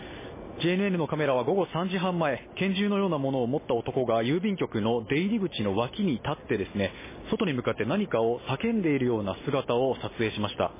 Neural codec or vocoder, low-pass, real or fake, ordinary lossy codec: none; 3.6 kHz; real; MP3, 16 kbps